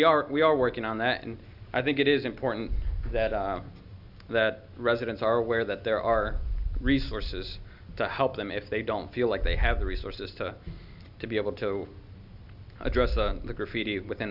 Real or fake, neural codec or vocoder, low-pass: real; none; 5.4 kHz